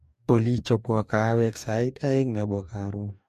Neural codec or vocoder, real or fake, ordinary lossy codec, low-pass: codec, 44.1 kHz, 2.6 kbps, DAC; fake; MP3, 64 kbps; 14.4 kHz